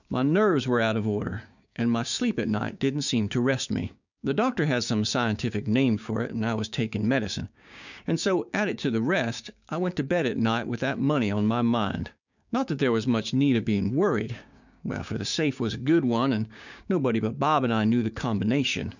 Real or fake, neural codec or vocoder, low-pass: fake; codec, 16 kHz, 6 kbps, DAC; 7.2 kHz